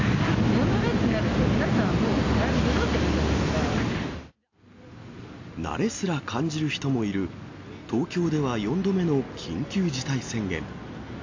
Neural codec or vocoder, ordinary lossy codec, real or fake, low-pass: none; AAC, 48 kbps; real; 7.2 kHz